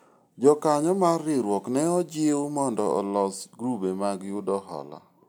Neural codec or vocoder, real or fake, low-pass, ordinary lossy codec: none; real; none; none